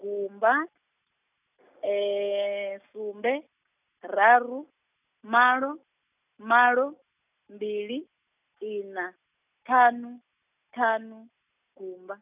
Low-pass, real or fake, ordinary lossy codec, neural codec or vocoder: 3.6 kHz; real; none; none